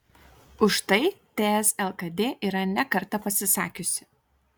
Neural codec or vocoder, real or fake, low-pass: none; real; 19.8 kHz